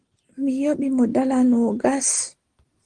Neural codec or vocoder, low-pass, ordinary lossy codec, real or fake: none; 9.9 kHz; Opus, 16 kbps; real